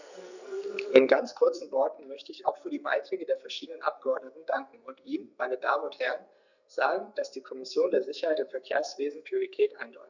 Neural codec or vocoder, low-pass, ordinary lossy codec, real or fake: codec, 44.1 kHz, 2.6 kbps, SNAC; 7.2 kHz; none; fake